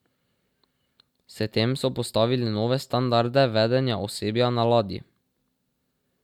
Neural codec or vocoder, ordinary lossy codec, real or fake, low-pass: none; none; real; 19.8 kHz